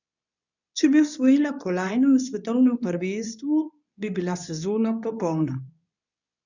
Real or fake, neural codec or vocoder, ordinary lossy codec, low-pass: fake; codec, 24 kHz, 0.9 kbps, WavTokenizer, medium speech release version 2; none; 7.2 kHz